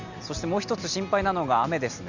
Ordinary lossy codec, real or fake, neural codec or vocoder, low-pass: none; real; none; 7.2 kHz